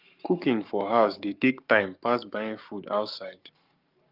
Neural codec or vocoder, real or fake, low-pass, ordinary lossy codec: codec, 44.1 kHz, 7.8 kbps, Pupu-Codec; fake; 5.4 kHz; Opus, 24 kbps